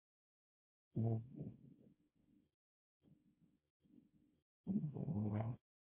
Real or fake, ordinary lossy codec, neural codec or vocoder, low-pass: fake; MP3, 32 kbps; codec, 24 kHz, 0.9 kbps, WavTokenizer, small release; 3.6 kHz